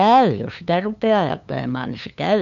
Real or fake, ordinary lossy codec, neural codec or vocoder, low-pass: fake; none; codec, 16 kHz, 2 kbps, FunCodec, trained on LibriTTS, 25 frames a second; 7.2 kHz